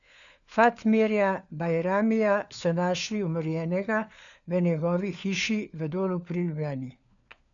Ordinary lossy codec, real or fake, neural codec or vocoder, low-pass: none; fake; codec, 16 kHz, 4 kbps, FunCodec, trained on LibriTTS, 50 frames a second; 7.2 kHz